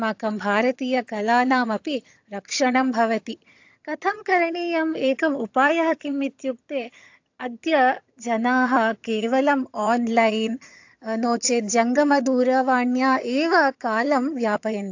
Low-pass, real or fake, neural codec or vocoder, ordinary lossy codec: 7.2 kHz; fake; vocoder, 22.05 kHz, 80 mel bands, HiFi-GAN; AAC, 48 kbps